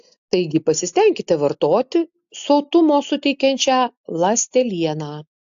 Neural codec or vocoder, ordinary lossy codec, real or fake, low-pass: none; AAC, 64 kbps; real; 7.2 kHz